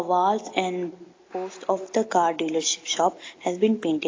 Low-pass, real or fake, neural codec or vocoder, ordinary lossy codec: 7.2 kHz; real; none; AAC, 32 kbps